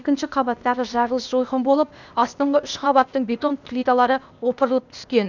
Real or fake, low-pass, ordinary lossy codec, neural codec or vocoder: fake; 7.2 kHz; none; codec, 16 kHz, 0.8 kbps, ZipCodec